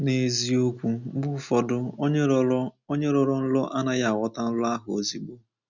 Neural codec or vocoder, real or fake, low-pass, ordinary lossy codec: none; real; 7.2 kHz; none